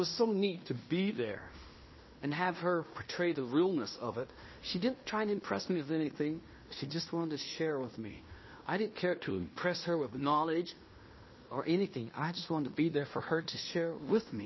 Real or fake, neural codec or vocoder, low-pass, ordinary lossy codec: fake; codec, 16 kHz in and 24 kHz out, 0.9 kbps, LongCat-Audio-Codec, fine tuned four codebook decoder; 7.2 kHz; MP3, 24 kbps